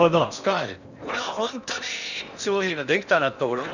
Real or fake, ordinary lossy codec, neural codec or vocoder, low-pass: fake; none; codec, 16 kHz in and 24 kHz out, 0.8 kbps, FocalCodec, streaming, 65536 codes; 7.2 kHz